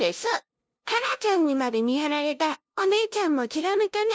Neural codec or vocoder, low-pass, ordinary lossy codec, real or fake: codec, 16 kHz, 0.5 kbps, FunCodec, trained on LibriTTS, 25 frames a second; none; none; fake